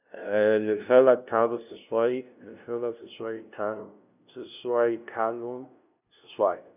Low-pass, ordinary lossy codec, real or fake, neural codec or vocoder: 3.6 kHz; none; fake; codec, 16 kHz, 0.5 kbps, FunCodec, trained on LibriTTS, 25 frames a second